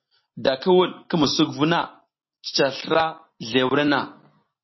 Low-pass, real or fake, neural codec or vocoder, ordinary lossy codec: 7.2 kHz; real; none; MP3, 24 kbps